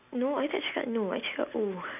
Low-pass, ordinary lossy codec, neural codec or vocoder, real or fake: 3.6 kHz; none; none; real